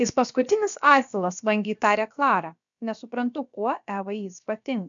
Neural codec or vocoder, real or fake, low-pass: codec, 16 kHz, about 1 kbps, DyCAST, with the encoder's durations; fake; 7.2 kHz